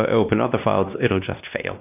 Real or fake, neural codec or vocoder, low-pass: fake; codec, 16 kHz, 1 kbps, X-Codec, WavLM features, trained on Multilingual LibriSpeech; 3.6 kHz